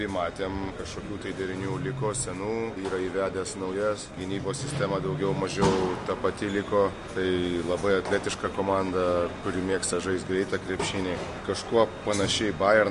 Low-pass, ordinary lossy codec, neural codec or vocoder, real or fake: 14.4 kHz; MP3, 48 kbps; none; real